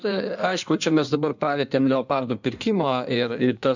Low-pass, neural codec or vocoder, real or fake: 7.2 kHz; codec, 16 kHz in and 24 kHz out, 1.1 kbps, FireRedTTS-2 codec; fake